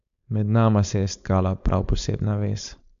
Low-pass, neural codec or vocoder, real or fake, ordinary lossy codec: 7.2 kHz; codec, 16 kHz, 4.8 kbps, FACodec; fake; AAC, 96 kbps